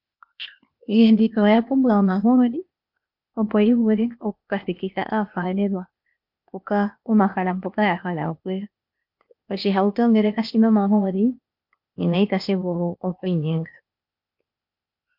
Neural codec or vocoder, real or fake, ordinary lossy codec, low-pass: codec, 16 kHz, 0.8 kbps, ZipCodec; fake; MP3, 48 kbps; 5.4 kHz